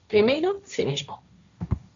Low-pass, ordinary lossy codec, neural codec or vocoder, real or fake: 7.2 kHz; Opus, 64 kbps; codec, 16 kHz, 1.1 kbps, Voila-Tokenizer; fake